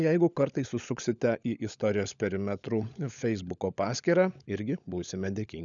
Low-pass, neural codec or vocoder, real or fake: 7.2 kHz; codec, 16 kHz, 16 kbps, FunCodec, trained on LibriTTS, 50 frames a second; fake